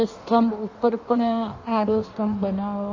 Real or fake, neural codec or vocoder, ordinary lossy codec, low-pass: fake; codec, 16 kHz in and 24 kHz out, 1.1 kbps, FireRedTTS-2 codec; MP3, 32 kbps; 7.2 kHz